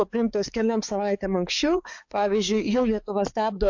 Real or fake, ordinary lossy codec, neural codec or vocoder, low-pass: fake; AAC, 48 kbps; codec, 16 kHz, 4 kbps, X-Codec, HuBERT features, trained on balanced general audio; 7.2 kHz